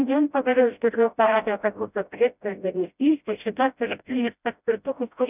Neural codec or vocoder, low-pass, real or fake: codec, 16 kHz, 0.5 kbps, FreqCodec, smaller model; 3.6 kHz; fake